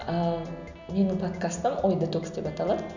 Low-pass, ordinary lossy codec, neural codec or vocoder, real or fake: 7.2 kHz; none; none; real